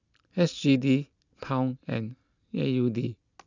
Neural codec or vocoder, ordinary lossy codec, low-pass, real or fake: none; none; 7.2 kHz; real